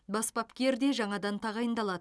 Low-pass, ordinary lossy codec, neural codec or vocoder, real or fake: none; none; none; real